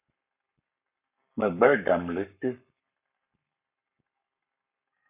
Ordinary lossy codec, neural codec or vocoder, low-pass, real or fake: AAC, 32 kbps; codec, 44.1 kHz, 7.8 kbps, Pupu-Codec; 3.6 kHz; fake